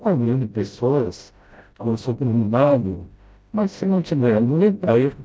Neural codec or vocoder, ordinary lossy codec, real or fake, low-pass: codec, 16 kHz, 0.5 kbps, FreqCodec, smaller model; none; fake; none